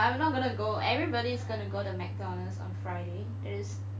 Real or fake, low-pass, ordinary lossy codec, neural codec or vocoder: real; none; none; none